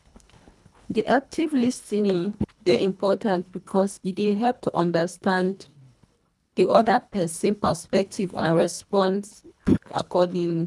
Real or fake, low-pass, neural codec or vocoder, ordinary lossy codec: fake; none; codec, 24 kHz, 1.5 kbps, HILCodec; none